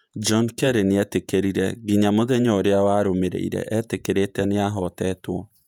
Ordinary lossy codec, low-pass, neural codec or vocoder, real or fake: none; 19.8 kHz; vocoder, 48 kHz, 128 mel bands, Vocos; fake